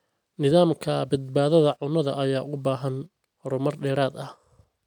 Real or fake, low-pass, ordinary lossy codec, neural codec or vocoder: real; 19.8 kHz; none; none